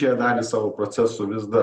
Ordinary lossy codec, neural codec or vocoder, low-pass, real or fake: Opus, 64 kbps; none; 14.4 kHz; real